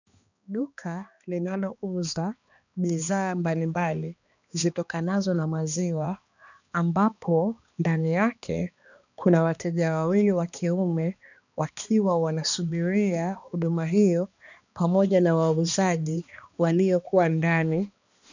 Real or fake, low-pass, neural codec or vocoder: fake; 7.2 kHz; codec, 16 kHz, 2 kbps, X-Codec, HuBERT features, trained on balanced general audio